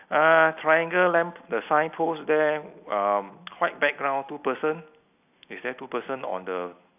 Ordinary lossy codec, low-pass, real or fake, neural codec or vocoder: none; 3.6 kHz; real; none